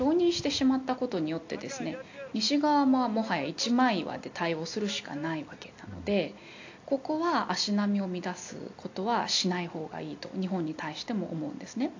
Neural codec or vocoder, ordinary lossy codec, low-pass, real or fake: none; AAC, 48 kbps; 7.2 kHz; real